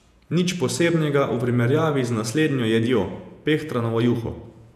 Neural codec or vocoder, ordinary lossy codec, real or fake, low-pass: vocoder, 48 kHz, 128 mel bands, Vocos; none; fake; 14.4 kHz